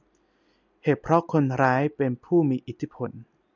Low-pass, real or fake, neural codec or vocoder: 7.2 kHz; real; none